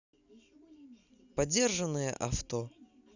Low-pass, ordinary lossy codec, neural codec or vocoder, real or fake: 7.2 kHz; none; none; real